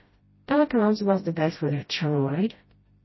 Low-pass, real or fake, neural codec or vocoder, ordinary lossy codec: 7.2 kHz; fake; codec, 16 kHz, 0.5 kbps, FreqCodec, smaller model; MP3, 24 kbps